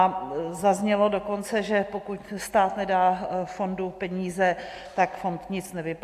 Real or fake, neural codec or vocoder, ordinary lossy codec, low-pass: real; none; AAC, 64 kbps; 14.4 kHz